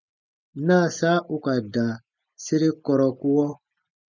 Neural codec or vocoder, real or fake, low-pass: none; real; 7.2 kHz